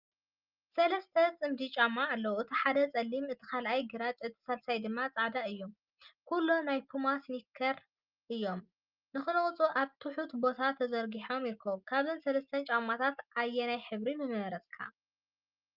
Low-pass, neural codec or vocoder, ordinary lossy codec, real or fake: 5.4 kHz; none; Opus, 24 kbps; real